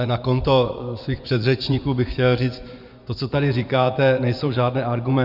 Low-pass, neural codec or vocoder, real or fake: 5.4 kHz; vocoder, 24 kHz, 100 mel bands, Vocos; fake